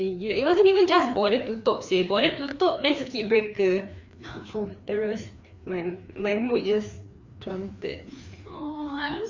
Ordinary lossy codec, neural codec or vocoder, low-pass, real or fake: MP3, 64 kbps; codec, 16 kHz, 2 kbps, FreqCodec, larger model; 7.2 kHz; fake